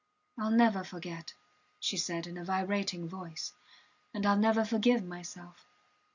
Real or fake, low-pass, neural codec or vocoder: real; 7.2 kHz; none